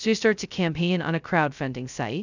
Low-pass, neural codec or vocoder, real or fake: 7.2 kHz; codec, 16 kHz, 0.2 kbps, FocalCodec; fake